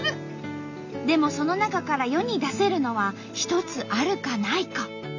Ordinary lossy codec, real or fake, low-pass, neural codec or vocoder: none; real; 7.2 kHz; none